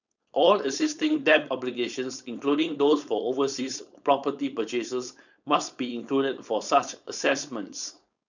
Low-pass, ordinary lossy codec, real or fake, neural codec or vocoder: 7.2 kHz; none; fake; codec, 16 kHz, 4.8 kbps, FACodec